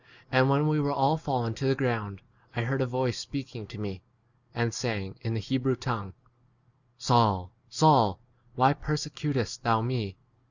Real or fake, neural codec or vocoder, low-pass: real; none; 7.2 kHz